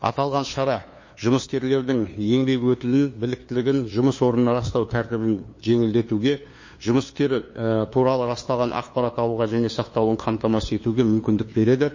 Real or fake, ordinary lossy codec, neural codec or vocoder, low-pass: fake; MP3, 32 kbps; codec, 16 kHz, 2 kbps, FunCodec, trained on LibriTTS, 25 frames a second; 7.2 kHz